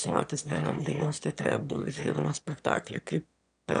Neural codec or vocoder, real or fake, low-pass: autoencoder, 22.05 kHz, a latent of 192 numbers a frame, VITS, trained on one speaker; fake; 9.9 kHz